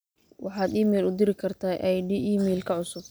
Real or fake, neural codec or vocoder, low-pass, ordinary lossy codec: real; none; none; none